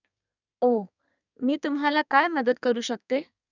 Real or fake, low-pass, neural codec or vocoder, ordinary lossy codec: fake; 7.2 kHz; codec, 32 kHz, 1.9 kbps, SNAC; none